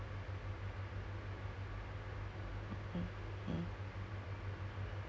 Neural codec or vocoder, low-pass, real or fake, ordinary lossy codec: none; none; real; none